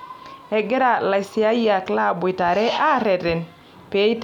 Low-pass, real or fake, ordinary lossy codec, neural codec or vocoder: 19.8 kHz; real; none; none